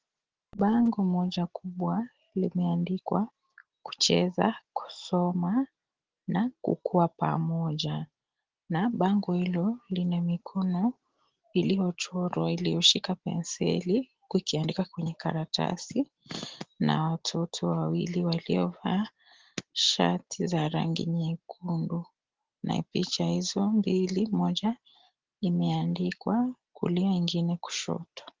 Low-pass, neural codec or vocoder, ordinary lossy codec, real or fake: 7.2 kHz; none; Opus, 16 kbps; real